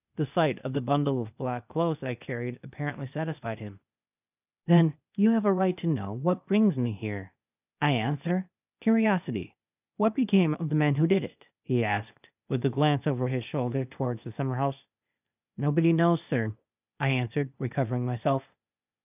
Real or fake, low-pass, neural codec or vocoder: fake; 3.6 kHz; codec, 16 kHz, 0.8 kbps, ZipCodec